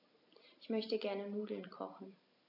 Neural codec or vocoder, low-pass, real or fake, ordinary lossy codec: none; 5.4 kHz; real; AAC, 32 kbps